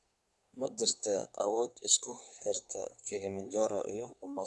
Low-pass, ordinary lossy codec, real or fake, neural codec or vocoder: none; none; fake; codec, 24 kHz, 1 kbps, SNAC